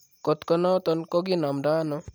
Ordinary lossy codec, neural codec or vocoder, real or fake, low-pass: none; none; real; none